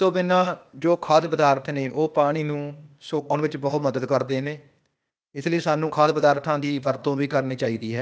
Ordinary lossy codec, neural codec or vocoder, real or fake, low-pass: none; codec, 16 kHz, 0.8 kbps, ZipCodec; fake; none